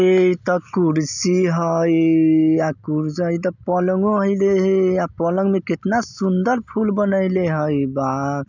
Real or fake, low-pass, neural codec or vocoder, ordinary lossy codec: real; 7.2 kHz; none; none